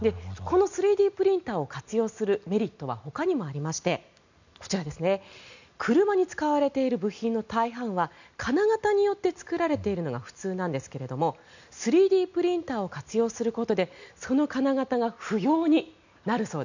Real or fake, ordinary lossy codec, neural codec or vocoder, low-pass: real; none; none; 7.2 kHz